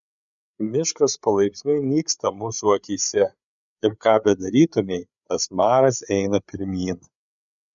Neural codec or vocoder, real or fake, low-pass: codec, 16 kHz, 8 kbps, FreqCodec, larger model; fake; 7.2 kHz